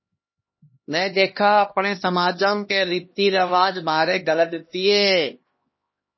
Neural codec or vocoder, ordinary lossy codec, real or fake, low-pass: codec, 16 kHz, 1 kbps, X-Codec, HuBERT features, trained on LibriSpeech; MP3, 24 kbps; fake; 7.2 kHz